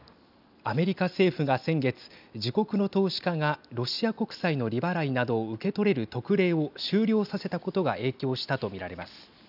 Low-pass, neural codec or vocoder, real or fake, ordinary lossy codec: 5.4 kHz; none; real; none